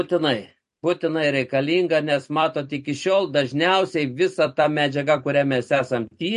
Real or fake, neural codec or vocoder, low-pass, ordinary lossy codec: fake; vocoder, 44.1 kHz, 128 mel bands every 256 samples, BigVGAN v2; 14.4 kHz; MP3, 48 kbps